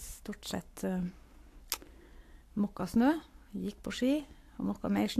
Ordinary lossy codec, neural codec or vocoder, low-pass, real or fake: AAC, 64 kbps; codec, 44.1 kHz, 7.8 kbps, Pupu-Codec; 14.4 kHz; fake